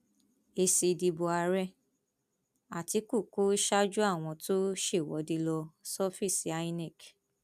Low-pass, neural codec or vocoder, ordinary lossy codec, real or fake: 14.4 kHz; none; none; real